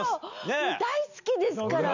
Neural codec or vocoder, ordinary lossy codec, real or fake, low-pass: none; none; real; 7.2 kHz